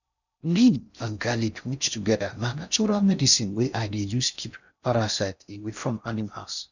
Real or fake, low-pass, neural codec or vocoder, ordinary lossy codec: fake; 7.2 kHz; codec, 16 kHz in and 24 kHz out, 0.6 kbps, FocalCodec, streaming, 4096 codes; none